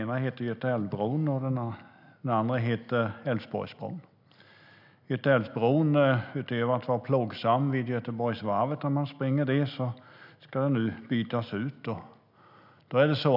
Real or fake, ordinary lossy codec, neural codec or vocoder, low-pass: real; none; none; 5.4 kHz